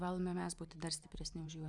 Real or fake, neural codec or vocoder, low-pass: fake; vocoder, 44.1 kHz, 128 mel bands every 512 samples, BigVGAN v2; 10.8 kHz